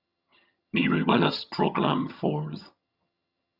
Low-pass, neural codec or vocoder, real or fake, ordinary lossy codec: 5.4 kHz; vocoder, 22.05 kHz, 80 mel bands, HiFi-GAN; fake; Opus, 64 kbps